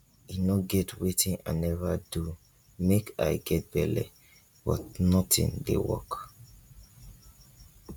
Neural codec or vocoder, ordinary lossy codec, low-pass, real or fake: none; none; 19.8 kHz; real